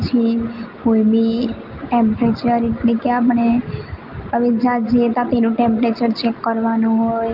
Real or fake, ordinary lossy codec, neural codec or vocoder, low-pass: real; Opus, 32 kbps; none; 5.4 kHz